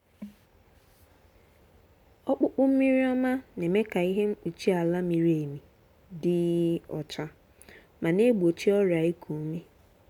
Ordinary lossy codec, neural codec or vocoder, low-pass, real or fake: none; none; 19.8 kHz; real